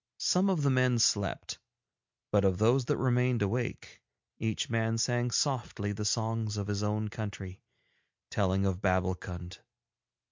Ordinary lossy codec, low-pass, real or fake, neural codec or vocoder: MP3, 64 kbps; 7.2 kHz; real; none